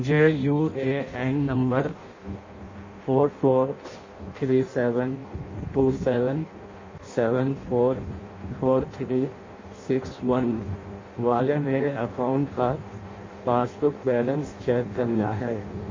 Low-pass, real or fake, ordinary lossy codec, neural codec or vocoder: 7.2 kHz; fake; MP3, 32 kbps; codec, 16 kHz in and 24 kHz out, 0.6 kbps, FireRedTTS-2 codec